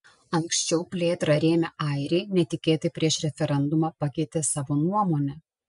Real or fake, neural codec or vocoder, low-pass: real; none; 10.8 kHz